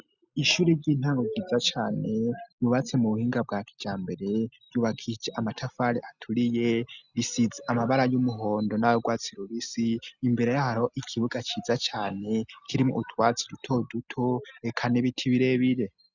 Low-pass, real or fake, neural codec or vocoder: 7.2 kHz; real; none